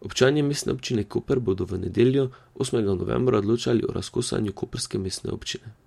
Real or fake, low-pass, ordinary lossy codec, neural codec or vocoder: real; 19.8 kHz; MP3, 64 kbps; none